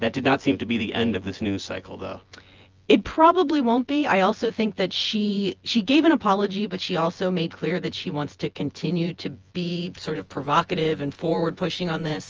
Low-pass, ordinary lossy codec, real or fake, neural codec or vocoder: 7.2 kHz; Opus, 24 kbps; fake; vocoder, 24 kHz, 100 mel bands, Vocos